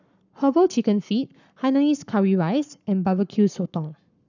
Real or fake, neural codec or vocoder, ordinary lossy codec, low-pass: fake; codec, 44.1 kHz, 7.8 kbps, Pupu-Codec; none; 7.2 kHz